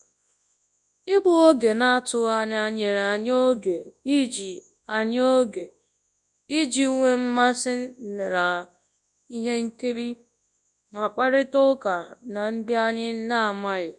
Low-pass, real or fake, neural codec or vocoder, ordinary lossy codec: 10.8 kHz; fake; codec, 24 kHz, 0.9 kbps, WavTokenizer, large speech release; none